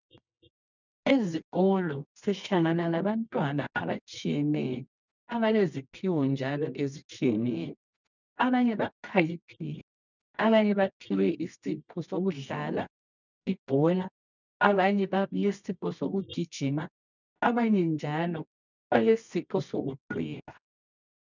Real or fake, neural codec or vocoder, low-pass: fake; codec, 24 kHz, 0.9 kbps, WavTokenizer, medium music audio release; 7.2 kHz